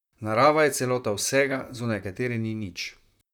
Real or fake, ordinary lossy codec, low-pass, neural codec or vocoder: fake; none; 19.8 kHz; vocoder, 44.1 kHz, 128 mel bands, Pupu-Vocoder